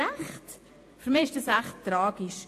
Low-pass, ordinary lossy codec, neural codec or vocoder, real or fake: 14.4 kHz; AAC, 64 kbps; vocoder, 48 kHz, 128 mel bands, Vocos; fake